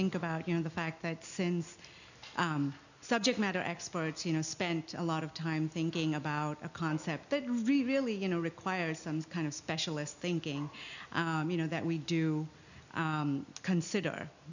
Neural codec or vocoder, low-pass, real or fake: none; 7.2 kHz; real